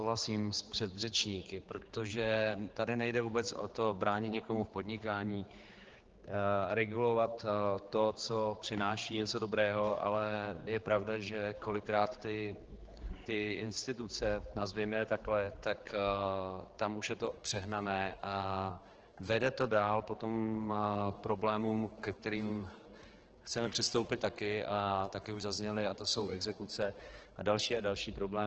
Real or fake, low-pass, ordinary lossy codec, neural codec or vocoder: fake; 7.2 kHz; Opus, 16 kbps; codec, 16 kHz, 4 kbps, X-Codec, HuBERT features, trained on general audio